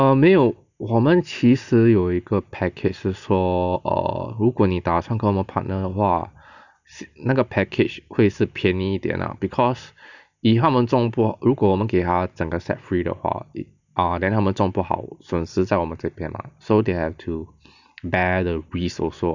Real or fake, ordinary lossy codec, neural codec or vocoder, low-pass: real; none; none; 7.2 kHz